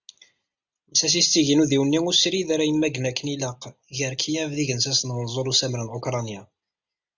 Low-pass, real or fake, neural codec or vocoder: 7.2 kHz; real; none